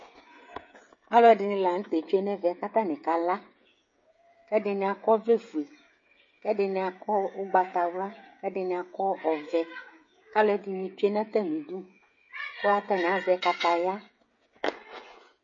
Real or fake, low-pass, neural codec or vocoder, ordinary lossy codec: fake; 7.2 kHz; codec, 16 kHz, 16 kbps, FreqCodec, smaller model; MP3, 32 kbps